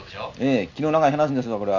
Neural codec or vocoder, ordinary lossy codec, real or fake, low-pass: none; none; real; 7.2 kHz